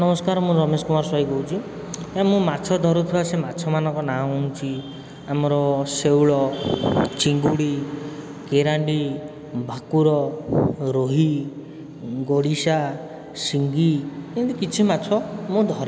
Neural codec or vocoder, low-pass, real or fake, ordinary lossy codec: none; none; real; none